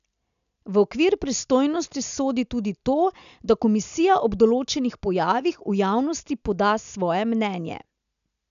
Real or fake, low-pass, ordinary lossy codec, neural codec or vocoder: real; 7.2 kHz; none; none